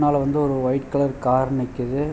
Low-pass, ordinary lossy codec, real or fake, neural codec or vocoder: none; none; real; none